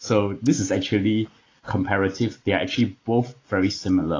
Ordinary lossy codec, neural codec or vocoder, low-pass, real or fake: AAC, 32 kbps; none; 7.2 kHz; real